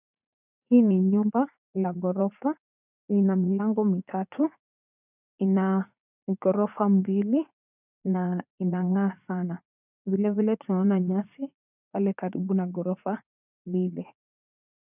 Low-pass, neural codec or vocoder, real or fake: 3.6 kHz; vocoder, 44.1 kHz, 80 mel bands, Vocos; fake